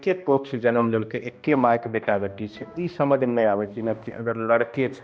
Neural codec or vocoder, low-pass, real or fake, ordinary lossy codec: codec, 16 kHz, 1 kbps, X-Codec, HuBERT features, trained on balanced general audio; none; fake; none